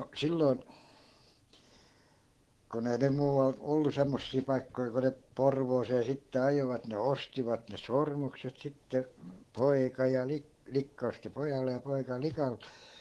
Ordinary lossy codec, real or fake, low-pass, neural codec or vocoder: Opus, 16 kbps; fake; 10.8 kHz; codec, 24 kHz, 3.1 kbps, DualCodec